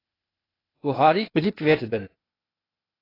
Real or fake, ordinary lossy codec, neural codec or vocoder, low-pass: fake; AAC, 24 kbps; codec, 16 kHz, 0.8 kbps, ZipCodec; 5.4 kHz